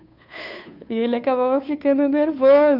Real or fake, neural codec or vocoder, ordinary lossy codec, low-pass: fake; codec, 16 kHz, 2 kbps, FunCodec, trained on Chinese and English, 25 frames a second; none; 5.4 kHz